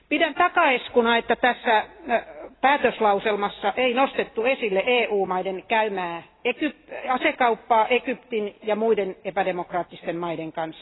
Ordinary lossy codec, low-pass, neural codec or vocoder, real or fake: AAC, 16 kbps; 7.2 kHz; none; real